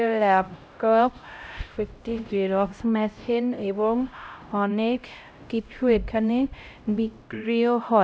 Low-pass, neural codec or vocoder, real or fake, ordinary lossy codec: none; codec, 16 kHz, 0.5 kbps, X-Codec, HuBERT features, trained on LibriSpeech; fake; none